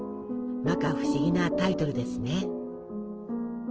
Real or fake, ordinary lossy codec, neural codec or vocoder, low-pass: real; Opus, 16 kbps; none; 7.2 kHz